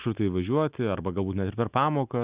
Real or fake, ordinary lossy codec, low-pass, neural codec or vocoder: real; Opus, 64 kbps; 3.6 kHz; none